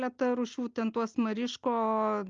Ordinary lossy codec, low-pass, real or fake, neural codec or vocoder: Opus, 24 kbps; 7.2 kHz; real; none